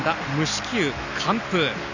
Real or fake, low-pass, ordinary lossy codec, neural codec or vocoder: real; 7.2 kHz; none; none